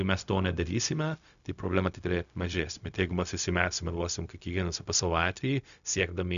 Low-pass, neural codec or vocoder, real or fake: 7.2 kHz; codec, 16 kHz, 0.4 kbps, LongCat-Audio-Codec; fake